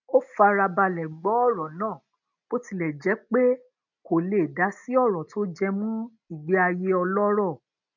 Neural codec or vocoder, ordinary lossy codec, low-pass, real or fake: none; none; 7.2 kHz; real